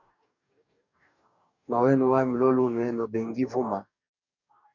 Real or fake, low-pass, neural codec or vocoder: fake; 7.2 kHz; codec, 44.1 kHz, 2.6 kbps, DAC